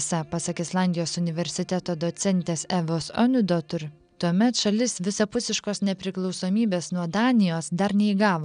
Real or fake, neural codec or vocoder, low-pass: real; none; 9.9 kHz